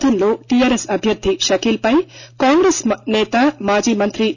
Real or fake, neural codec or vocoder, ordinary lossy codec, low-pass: real; none; none; 7.2 kHz